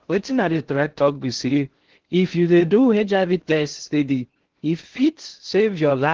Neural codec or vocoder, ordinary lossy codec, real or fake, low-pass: codec, 16 kHz in and 24 kHz out, 0.6 kbps, FocalCodec, streaming, 4096 codes; Opus, 16 kbps; fake; 7.2 kHz